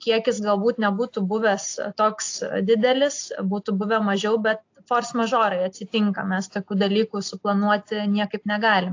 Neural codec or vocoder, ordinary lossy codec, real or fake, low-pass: none; AAC, 48 kbps; real; 7.2 kHz